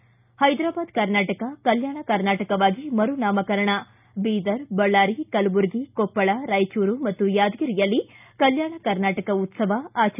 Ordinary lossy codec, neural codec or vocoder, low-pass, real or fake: none; none; 3.6 kHz; real